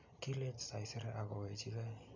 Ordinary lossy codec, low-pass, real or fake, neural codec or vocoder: none; 7.2 kHz; real; none